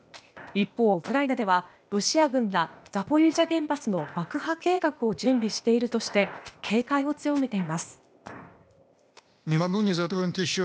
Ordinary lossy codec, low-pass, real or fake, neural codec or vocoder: none; none; fake; codec, 16 kHz, 0.8 kbps, ZipCodec